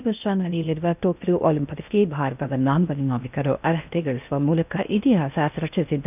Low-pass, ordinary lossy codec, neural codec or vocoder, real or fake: 3.6 kHz; none; codec, 16 kHz in and 24 kHz out, 0.6 kbps, FocalCodec, streaming, 2048 codes; fake